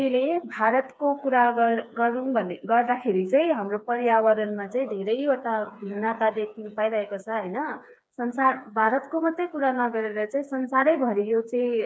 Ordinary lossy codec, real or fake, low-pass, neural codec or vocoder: none; fake; none; codec, 16 kHz, 4 kbps, FreqCodec, smaller model